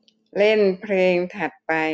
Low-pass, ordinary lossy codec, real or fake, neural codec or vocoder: none; none; real; none